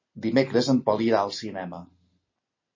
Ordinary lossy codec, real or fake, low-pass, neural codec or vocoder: MP3, 32 kbps; real; 7.2 kHz; none